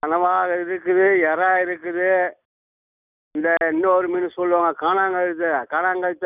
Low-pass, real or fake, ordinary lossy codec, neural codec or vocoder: 3.6 kHz; real; none; none